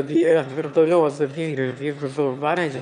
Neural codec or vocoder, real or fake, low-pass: autoencoder, 22.05 kHz, a latent of 192 numbers a frame, VITS, trained on one speaker; fake; 9.9 kHz